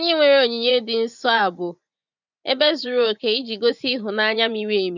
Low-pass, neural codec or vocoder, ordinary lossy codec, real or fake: 7.2 kHz; vocoder, 24 kHz, 100 mel bands, Vocos; none; fake